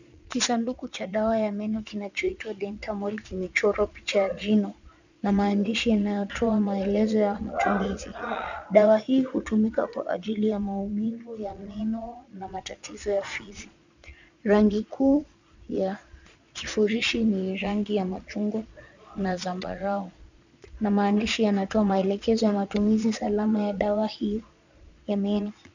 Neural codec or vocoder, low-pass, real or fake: vocoder, 22.05 kHz, 80 mel bands, Vocos; 7.2 kHz; fake